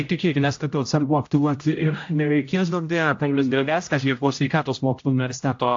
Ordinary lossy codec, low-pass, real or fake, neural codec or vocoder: AAC, 48 kbps; 7.2 kHz; fake; codec, 16 kHz, 0.5 kbps, X-Codec, HuBERT features, trained on general audio